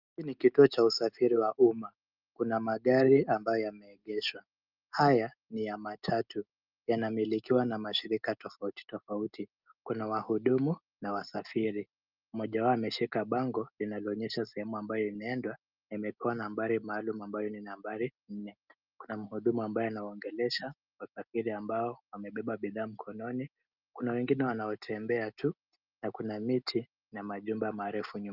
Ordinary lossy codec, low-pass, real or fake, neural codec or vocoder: Opus, 24 kbps; 5.4 kHz; real; none